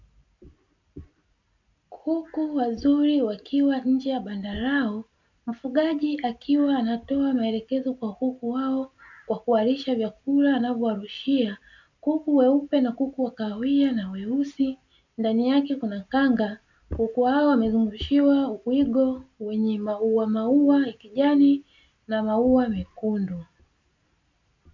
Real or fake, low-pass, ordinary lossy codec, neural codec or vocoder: real; 7.2 kHz; MP3, 64 kbps; none